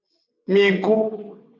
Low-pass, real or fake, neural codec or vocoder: 7.2 kHz; fake; vocoder, 22.05 kHz, 80 mel bands, WaveNeXt